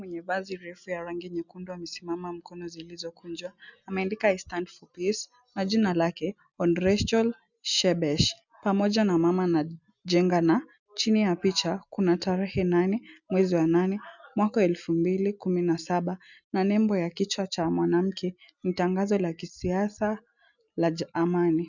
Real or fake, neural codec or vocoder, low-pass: real; none; 7.2 kHz